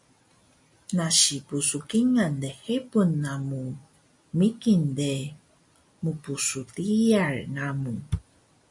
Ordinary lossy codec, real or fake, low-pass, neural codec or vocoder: MP3, 96 kbps; real; 10.8 kHz; none